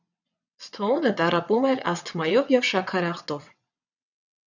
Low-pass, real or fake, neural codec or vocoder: 7.2 kHz; fake; vocoder, 22.05 kHz, 80 mel bands, WaveNeXt